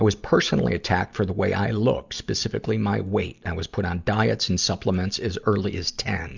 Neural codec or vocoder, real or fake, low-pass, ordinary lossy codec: none; real; 7.2 kHz; Opus, 64 kbps